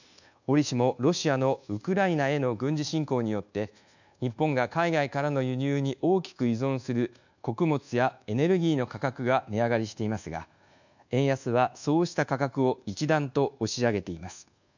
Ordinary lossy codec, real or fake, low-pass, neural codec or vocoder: none; fake; 7.2 kHz; codec, 24 kHz, 1.2 kbps, DualCodec